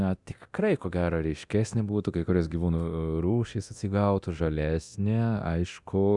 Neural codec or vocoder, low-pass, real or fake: codec, 24 kHz, 0.9 kbps, DualCodec; 10.8 kHz; fake